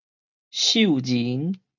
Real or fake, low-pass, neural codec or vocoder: real; 7.2 kHz; none